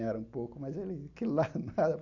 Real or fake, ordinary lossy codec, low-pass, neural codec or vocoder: real; none; 7.2 kHz; none